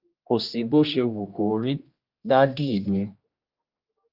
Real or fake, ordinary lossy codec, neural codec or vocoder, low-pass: fake; Opus, 24 kbps; codec, 16 kHz, 1 kbps, X-Codec, HuBERT features, trained on general audio; 5.4 kHz